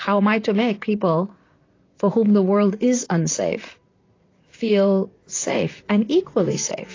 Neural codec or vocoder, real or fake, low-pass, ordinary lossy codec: vocoder, 44.1 kHz, 128 mel bands, Pupu-Vocoder; fake; 7.2 kHz; AAC, 32 kbps